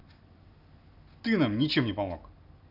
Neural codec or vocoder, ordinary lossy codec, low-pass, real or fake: none; AAC, 48 kbps; 5.4 kHz; real